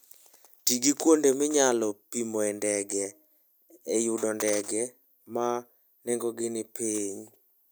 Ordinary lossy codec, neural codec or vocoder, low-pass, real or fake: none; none; none; real